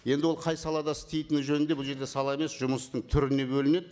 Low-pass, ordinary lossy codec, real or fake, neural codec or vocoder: none; none; real; none